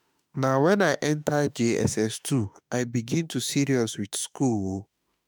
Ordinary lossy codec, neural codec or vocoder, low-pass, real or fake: none; autoencoder, 48 kHz, 32 numbers a frame, DAC-VAE, trained on Japanese speech; none; fake